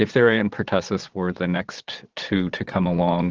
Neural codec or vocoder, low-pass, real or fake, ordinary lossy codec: vocoder, 22.05 kHz, 80 mel bands, Vocos; 7.2 kHz; fake; Opus, 16 kbps